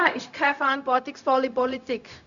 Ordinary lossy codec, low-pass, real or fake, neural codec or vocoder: none; 7.2 kHz; fake; codec, 16 kHz, 0.4 kbps, LongCat-Audio-Codec